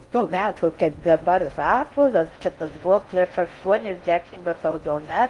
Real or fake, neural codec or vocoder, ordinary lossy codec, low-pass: fake; codec, 16 kHz in and 24 kHz out, 0.6 kbps, FocalCodec, streaming, 4096 codes; Opus, 24 kbps; 10.8 kHz